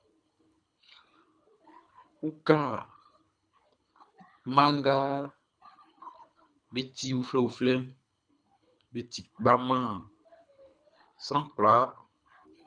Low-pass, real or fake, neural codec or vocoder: 9.9 kHz; fake; codec, 24 kHz, 3 kbps, HILCodec